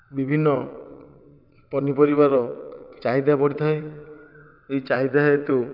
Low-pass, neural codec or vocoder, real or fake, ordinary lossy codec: 5.4 kHz; vocoder, 22.05 kHz, 80 mel bands, WaveNeXt; fake; none